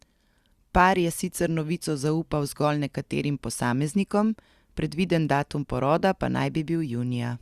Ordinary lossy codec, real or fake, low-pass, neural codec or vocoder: Opus, 64 kbps; real; 14.4 kHz; none